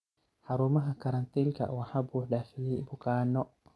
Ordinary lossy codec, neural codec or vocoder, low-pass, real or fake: none; none; none; real